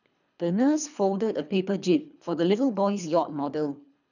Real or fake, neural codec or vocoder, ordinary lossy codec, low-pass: fake; codec, 24 kHz, 3 kbps, HILCodec; none; 7.2 kHz